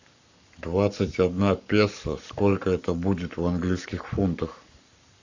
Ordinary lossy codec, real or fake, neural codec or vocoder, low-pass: Opus, 64 kbps; fake; codec, 44.1 kHz, 7.8 kbps, Pupu-Codec; 7.2 kHz